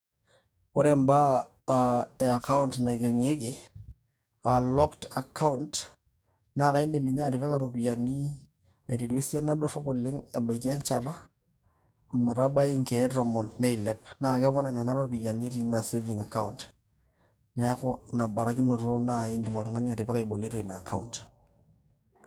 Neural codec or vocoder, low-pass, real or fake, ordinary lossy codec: codec, 44.1 kHz, 2.6 kbps, DAC; none; fake; none